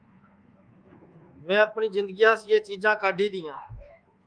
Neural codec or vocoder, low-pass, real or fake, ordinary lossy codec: codec, 24 kHz, 1.2 kbps, DualCodec; 9.9 kHz; fake; Opus, 32 kbps